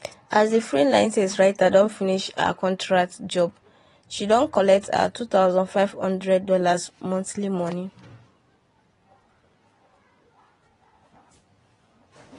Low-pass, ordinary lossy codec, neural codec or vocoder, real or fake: 10.8 kHz; AAC, 32 kbps; vocoder, 24 kHz, 100 mel bands, Vocos; fake